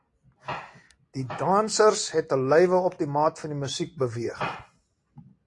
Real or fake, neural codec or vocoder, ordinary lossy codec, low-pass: real; none; AAC, 48 kbps; 10.8 kHz